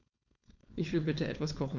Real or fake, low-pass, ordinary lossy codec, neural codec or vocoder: fake; 7.2 kHz; none; codec, 16 kHz, 4.8 kbps, FACodec